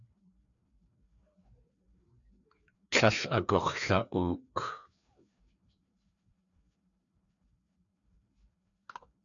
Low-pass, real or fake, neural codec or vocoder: 7.2 kHz; fake; codec, 16 kHz, 2 kbps, FreqCodec, larger model